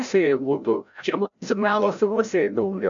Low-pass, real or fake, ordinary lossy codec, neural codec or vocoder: 7.2 kHz; fake; MP3, 64 kbps; codec, 16 kHz, 0.5 kbps, FreqCodec, larger model